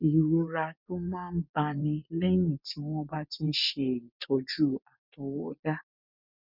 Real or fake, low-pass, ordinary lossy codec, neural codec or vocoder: fake; 5.4 kHz; none; vocoder, 24 kHz, 100 mel bands, Vocos